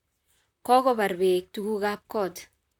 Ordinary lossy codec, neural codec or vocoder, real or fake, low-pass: none; vocoder, 44.1 kHz, 128 mel bands, Pupu-Vocoder; fake; 19.8 kHz